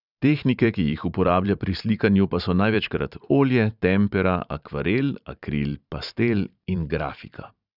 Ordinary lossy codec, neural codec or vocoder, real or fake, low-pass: none; vocoder, 44.1 kHz, 128 mel bands every 512 samples, BigVGAN v2; fake; 5.4 kHz